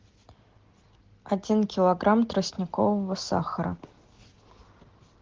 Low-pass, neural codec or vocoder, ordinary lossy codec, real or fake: 7.2 kHz; none; Opus, 16 kbps; real